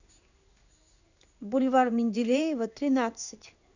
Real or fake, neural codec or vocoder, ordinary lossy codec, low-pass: fake; codec, 16 kHz in and 24 kHz out, 1 kbps, XY-Tokenizer; none; 7.2 kHz